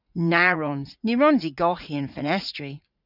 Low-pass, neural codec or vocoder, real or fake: 5.4 kHz; vocoder, 44.1 kHz, 80 mel bands, Vocos; fake